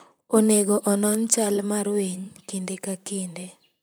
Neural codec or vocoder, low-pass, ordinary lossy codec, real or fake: vocoder, 44.1 kHz, 128 mel bands, Pupu-Vocoder; none; none; fake